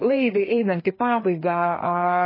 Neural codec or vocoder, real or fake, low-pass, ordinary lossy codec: codec, 16 kHz, 2 kbps, FreqCodec, larger model; fake; 5.4 kHz; MP3, 24 kbps